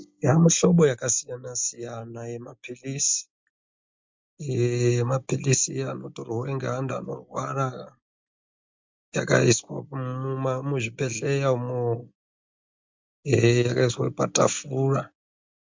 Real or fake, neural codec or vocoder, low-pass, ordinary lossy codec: real; none; 7.2 kHz; MP3, 64 kbps